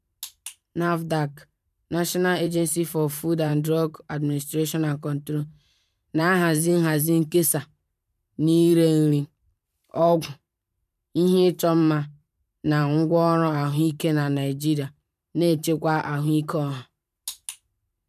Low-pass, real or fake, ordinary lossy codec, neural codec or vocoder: 14.4 kHz; fake; none; vocoder, 44.1 kHz, 128 mel bands every 512 samples, BigVGAN v2